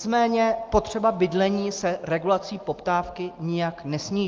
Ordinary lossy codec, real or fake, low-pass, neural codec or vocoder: Opus, 24 kbps; real; 7.2 kHz; none